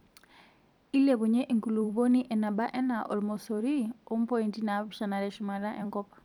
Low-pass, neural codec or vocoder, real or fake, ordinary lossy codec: 19.8 kHz; vocoder, 44.1 kHz, 128 mel bands every 256 samples, BigVGAN v2; fake; none